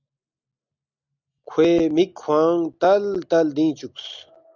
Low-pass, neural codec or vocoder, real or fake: 7.2 kHz; none; real